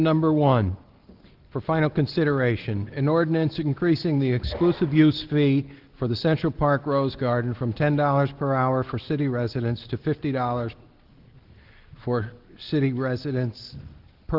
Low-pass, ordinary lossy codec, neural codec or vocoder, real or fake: 5.4 kHz; Opus, 16 kbps; none; real